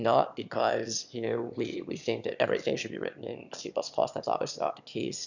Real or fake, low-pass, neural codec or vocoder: fake; 7.2 kHz; autoencoder, 22.05 kHz, a latent of 192 numbers a frame, VITS, trained on one speaker